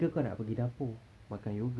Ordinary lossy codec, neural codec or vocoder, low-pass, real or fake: none; none; none; real